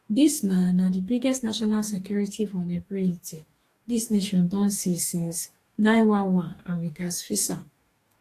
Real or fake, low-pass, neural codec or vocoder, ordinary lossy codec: fake; 14.4 kHz; codec, 44.1 kHz, 2.6 kbps, DAC; AAC, 64 kbps